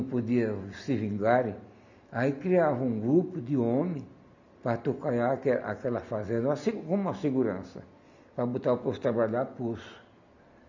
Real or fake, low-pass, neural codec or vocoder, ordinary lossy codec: real; 7.2 kHz; none; none